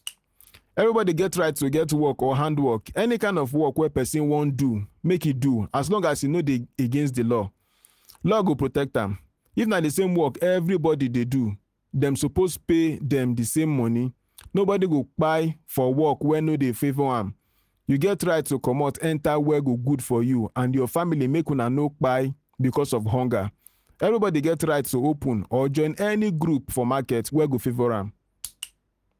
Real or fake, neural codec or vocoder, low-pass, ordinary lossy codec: real; none; 14.4 kHz; Opus, 24 kbps